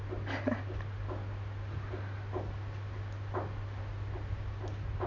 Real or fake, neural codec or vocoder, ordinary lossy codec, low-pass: real; none; none; 7.2 kHz